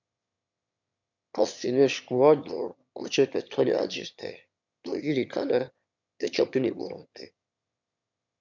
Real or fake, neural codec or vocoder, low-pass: fake; autoencoder, 22.05 kHz, a latent of 192 numbers a frame, VITS, trained on one speaker; 7.2 kHz